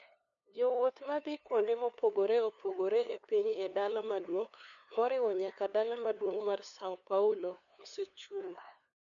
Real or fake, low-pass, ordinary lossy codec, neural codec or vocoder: fake; 7.2 kHz; none; codec, 16 kHz, 2 kbps, FunCodec, trained on LibriTTS, 25 frames a second